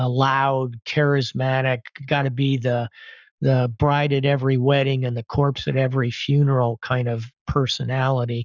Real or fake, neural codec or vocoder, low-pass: fake; codec, 44.1 kHz, 7.8 kbps, Pupu-Codec; 7.2 kHz